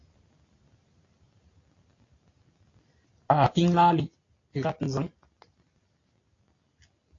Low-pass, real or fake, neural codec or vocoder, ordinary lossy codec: 7.2 kHz; real; none; AAC, 32 kbps